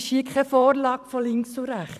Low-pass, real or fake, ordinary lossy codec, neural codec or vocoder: 14.4 kHz; fake; none; vocoder, 44.1 kHz, 128 mel bands every 512 samples, BigVGAN v2